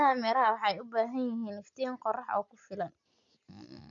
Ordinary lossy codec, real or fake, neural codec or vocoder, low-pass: none; real; none; 7.2 kHz